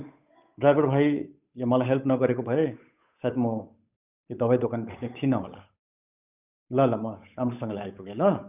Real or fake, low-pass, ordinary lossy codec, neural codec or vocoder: fake; 3.6 kHz; none; codec, 16 kHz, 8 kbps, FunCodec, trained on Chinese and English, 25 frames a second